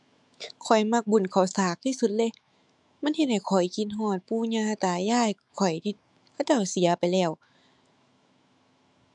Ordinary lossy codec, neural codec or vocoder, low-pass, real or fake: none; autoencoder, 48 kHz, 128 numbers a frame, DAC-VAE, trained on Japanese speech; 10.8 kHz; fake